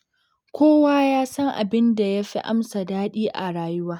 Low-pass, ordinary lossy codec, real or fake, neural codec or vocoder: 19.8 kHz; none; real; none